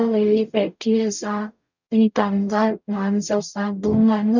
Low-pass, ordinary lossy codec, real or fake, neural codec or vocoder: 7.2 kHz; none; fake; codec, 44.1 kHz, 0.9 kbps, DAC